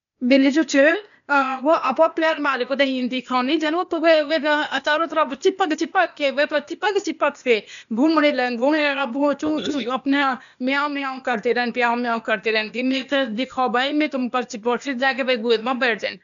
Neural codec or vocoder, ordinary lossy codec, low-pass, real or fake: codec, 16 kHz, 0.8 kbps, ZipCodec; MP3, 96 kbps; 7.2 kHz; fake